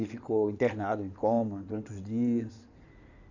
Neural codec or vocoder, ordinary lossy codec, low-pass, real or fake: codec, 16 kHz in and 24 kHz out, 2.2 kbps, FireRedTTS-2 codec; none; 7.2 kHz; fake